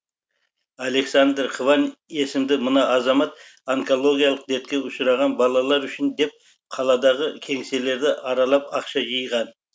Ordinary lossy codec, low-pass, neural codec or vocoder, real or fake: none; none; none; real